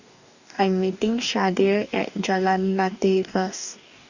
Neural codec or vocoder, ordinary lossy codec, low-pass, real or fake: codec, 44.1 kHz, 2.6 kbps, DAC; none; 7.2 kHz; fake